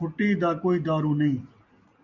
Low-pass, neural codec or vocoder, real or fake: 7.2 kHz; none; real